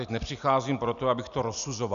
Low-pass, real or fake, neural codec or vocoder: 7.2 kHz; real; none